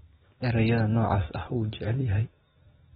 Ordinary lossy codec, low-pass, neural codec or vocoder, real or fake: AAC, 16 kbps; 7.2 kHz; none; real